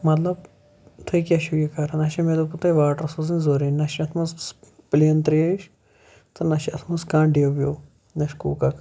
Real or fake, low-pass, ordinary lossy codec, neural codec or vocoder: real; none; none; none